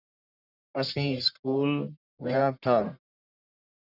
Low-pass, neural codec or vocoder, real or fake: 5.4 kHz; codec, 44.1 kHz, 1.7 kbps, Pupu-Codec; fake